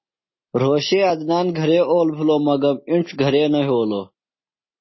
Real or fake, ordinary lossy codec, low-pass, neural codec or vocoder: real; MP3, 24 kbps; 7.2 kHz; none